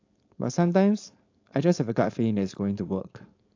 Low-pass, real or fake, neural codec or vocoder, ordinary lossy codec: 7.2 kHz; fake; codec, 16 kHz, 4.8 kbps, FACodec; AAC, 48 kbps